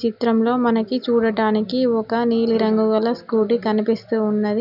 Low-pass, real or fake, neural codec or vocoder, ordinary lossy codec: 5.4 kHz; real; none; none